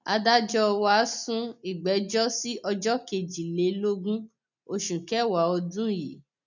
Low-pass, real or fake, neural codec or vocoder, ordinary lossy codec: 7.2 kHz; real; none; none